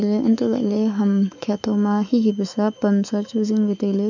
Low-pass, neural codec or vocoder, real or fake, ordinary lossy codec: 7.2 kHz; autoencoder, 48 kHz, 128 numbers a frame, DAC-VAE, trained on Japanese speech; fake; none